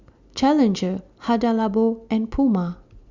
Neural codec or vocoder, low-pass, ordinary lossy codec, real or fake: none; 7.2 kHz; Opus, 64 kbps; real